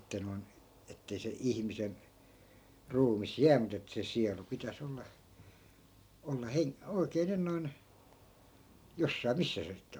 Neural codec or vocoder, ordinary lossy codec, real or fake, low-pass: none; none; real; none